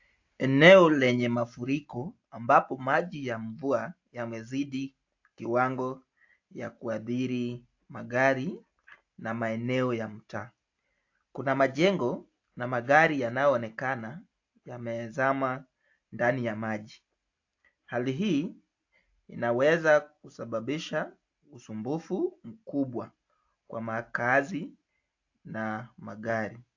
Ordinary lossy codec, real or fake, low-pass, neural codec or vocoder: AAC, 48 kbps; real; 7.2 kHz; none